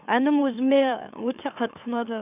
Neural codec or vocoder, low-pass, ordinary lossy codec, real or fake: autoencoder, 44.1 kHz, a latent of 192 numbers a frame, MeloTTS; 3.6 kHz; none; fake